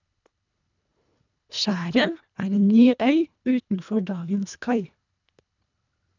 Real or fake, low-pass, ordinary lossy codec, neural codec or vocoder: fake; 7.2 kHz; none; codec, 24 kHz, 1.5 kbps, HILCodec